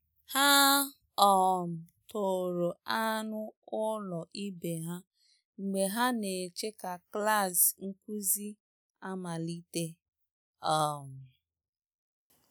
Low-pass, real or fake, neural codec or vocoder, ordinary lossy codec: none; real; none; none